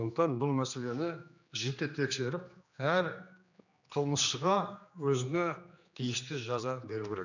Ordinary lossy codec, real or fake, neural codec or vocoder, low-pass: none; fake; codec, 16 kHz, 2 kbps, X-Codec, HuBERT features, trained on general audio; 7.2 kHz